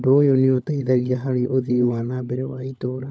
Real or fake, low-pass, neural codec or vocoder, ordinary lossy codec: fake; none; codec, 16 kHz, 16 kbps, FunCodec, trained on LibriTTS, 50 frames a second; none